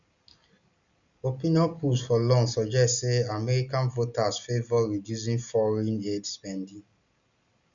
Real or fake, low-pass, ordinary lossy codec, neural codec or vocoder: real; 7.2 kHz; none; none